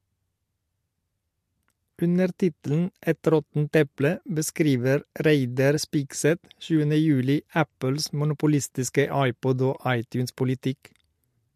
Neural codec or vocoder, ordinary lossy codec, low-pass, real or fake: none; MP3, 64 kbps; 14.4 kHz; real